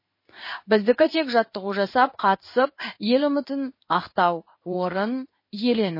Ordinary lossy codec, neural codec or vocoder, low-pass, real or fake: MP3, 24 kbps; codec, 16 kHz in and 24 kHz out, 1 kbps, XY-Tokenizer; 5.4 kHz; fake